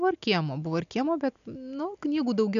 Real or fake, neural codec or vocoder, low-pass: real; none; 7.2 kHz